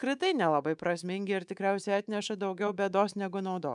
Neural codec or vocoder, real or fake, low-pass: codec, 24 kHz, 3.1 kbps, DualCodec; fake; 10.8 kHz